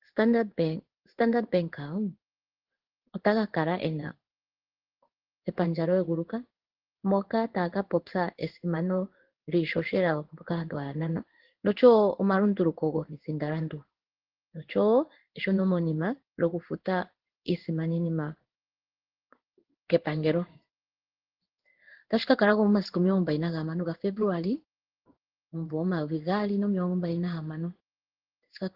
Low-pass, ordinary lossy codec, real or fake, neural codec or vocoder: 5.4 kHz; Opus, 16 kbps; fake; codec, 16 kHz in and 24 kHz out, 1 kbps, XY-Tokenizer